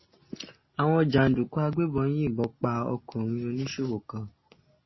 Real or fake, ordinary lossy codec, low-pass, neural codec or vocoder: real; MP3, 24 kbps; 7.2 kHz; none